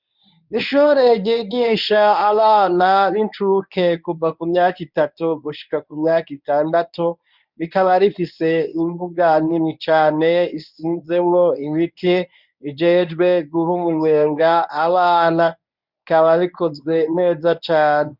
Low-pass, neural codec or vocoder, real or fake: 5.4 kHz; codec, 24 kHz, 0.9 kbps, WavTokenizer, medium speech release version 1; fake